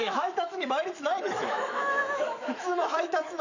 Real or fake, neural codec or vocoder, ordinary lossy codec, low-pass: fake; autoencoder, 48 kHz, 128 numbers a frame, DAC-VAE, trained on Japanese speech; none; 7.2 kHz